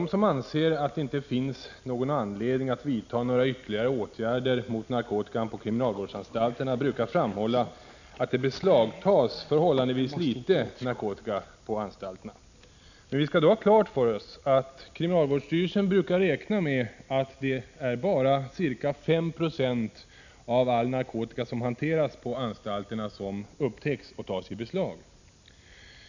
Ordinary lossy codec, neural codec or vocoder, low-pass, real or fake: none; none; 7.2 kHz; real